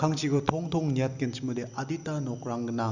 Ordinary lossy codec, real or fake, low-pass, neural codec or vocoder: Opus, 64 kbps; real; 7.2 kHz; none